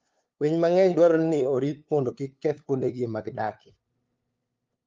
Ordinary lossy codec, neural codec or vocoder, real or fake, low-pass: Opus, 24 kbps; codec, 16 kHz, 2 kbps, FunCodec, trained on LibriTTS, 25 frames a second; fake; 7.2 kHz